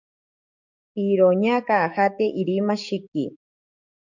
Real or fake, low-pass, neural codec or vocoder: fake; 7.2 kHz; codec, 44.1 kHz, 7.8 kbps, DAC